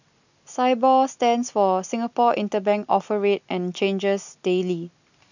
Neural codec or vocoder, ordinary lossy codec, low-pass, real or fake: none; none; 7.2 kHz; real